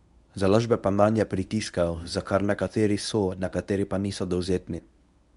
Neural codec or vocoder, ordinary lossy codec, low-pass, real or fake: codec, 24 kHz, 0.9 kbps, WavTokenizer, medium speech release version 1; none; 10.8 kHz; fake